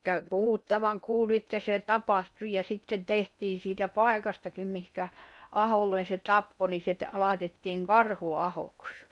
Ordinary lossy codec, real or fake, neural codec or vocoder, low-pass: none; fake; codec, 16 kHz in and 24 kHz out, 0.8 kbps, FocalCodec, streaming, 65536 codes; 10.8 kHz